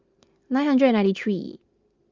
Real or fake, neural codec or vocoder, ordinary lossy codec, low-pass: real; none; Opus, 32 kbps; 7.2 kHz